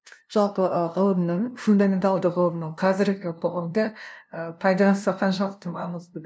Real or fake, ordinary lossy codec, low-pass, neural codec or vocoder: fake; none; none; codec, 16 kHz, 0.5 kbps, FunCodec, trained on LibriTTS, 25 frames a second